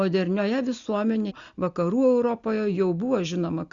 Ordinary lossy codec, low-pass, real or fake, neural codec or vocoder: Opus, 64 kbps; 7.2 kHz; real; none